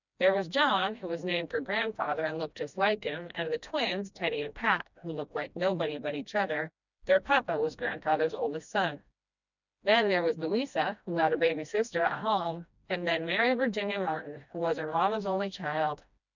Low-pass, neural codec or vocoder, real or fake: 7.2 kHz; codec, 16 kHz, 1 kbps, FreqCodec, smaller model; fake